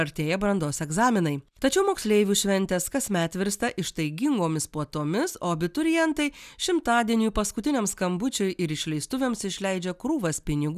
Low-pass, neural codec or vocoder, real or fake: 14.4 kHz; none; real